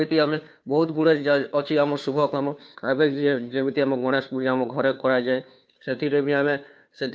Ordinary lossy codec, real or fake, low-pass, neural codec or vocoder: none; fake; none; codec, 16 kHz, 2 kbps, FunCodec, trained on Chinese and English, 25 frames a second